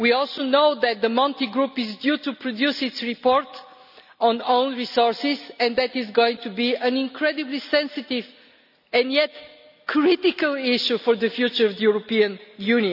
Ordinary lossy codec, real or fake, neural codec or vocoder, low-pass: none; real; none; 5.4 kHz